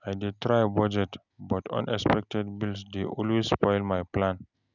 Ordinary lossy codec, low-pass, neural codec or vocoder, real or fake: none; 7.2 kHz; none; real